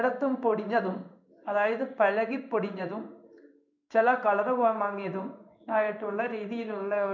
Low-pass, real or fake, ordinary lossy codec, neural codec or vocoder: 7.2 kHz; fake; none; codec, 16 kHz in and 24 kHz out, 1 kbps, XY-Tokenizer